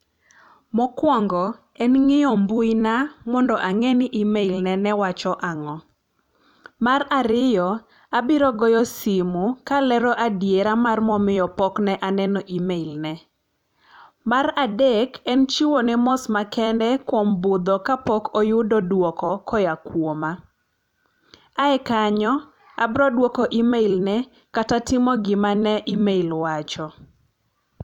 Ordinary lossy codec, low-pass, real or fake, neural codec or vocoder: none; 19.8 kHz; fake; vocoder, 44.1 kHz, 128 mel bands every 256 samples, BigVGAN v2